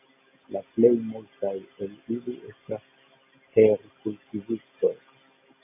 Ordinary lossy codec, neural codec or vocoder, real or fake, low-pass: AAC, 32 kbps; none; real; 3.6 kHz